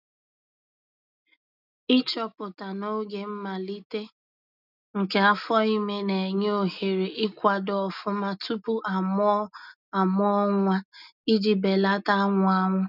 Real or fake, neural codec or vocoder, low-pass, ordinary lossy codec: real; none; 5.4 kHz; none